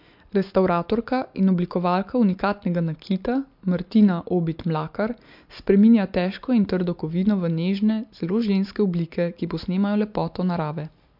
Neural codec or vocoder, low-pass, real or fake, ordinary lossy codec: none; 5.4 kHz; real; MP3, 48 kbps